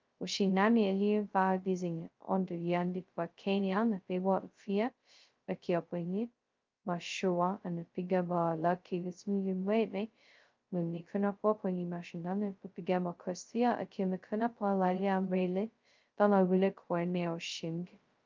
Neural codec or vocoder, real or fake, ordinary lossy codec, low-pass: codec, 16 kHz, 0.2 kbps, FocalCodec; fake; Opus, 24 kbps; 7.2 kHz